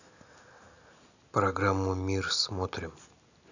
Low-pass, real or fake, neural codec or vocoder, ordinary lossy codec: 7.2 kHz; real; none; none